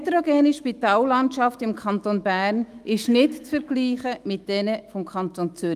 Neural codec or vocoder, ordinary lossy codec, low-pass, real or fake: autoencoder, 48 kHz, 128 numbers a frame, DAC-VAE, trained on Japanese speech; Opus, 32 kbps; 14.4 kHz; fake